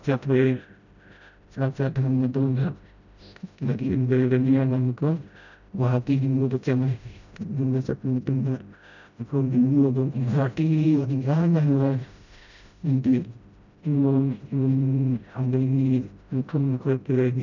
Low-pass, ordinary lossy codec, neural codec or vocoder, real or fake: 7.2 kHz; none; codec, 16 kHz, 0.5 kbps, FreqCodec, smaller model; fake